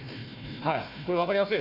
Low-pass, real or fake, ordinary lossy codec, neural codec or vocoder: 5.4 kHz; fake; none; codec, 16 kHz, 1 kbps, FunCodec, trained on Chinese and English, 50 frames a second